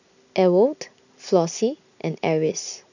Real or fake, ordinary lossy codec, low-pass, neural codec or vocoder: real; none; 7.2 kHz; none